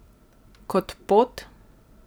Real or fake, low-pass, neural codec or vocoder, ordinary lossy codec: fake; none; vocoder, 44.1 kHz, 128 mel bands every 256 samples, BigVGAN v2; none